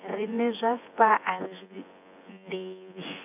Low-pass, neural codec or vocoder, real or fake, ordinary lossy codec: 3.6 kHz; vocoder, 24 kHz, 100 mel bands, Vocos; fake; none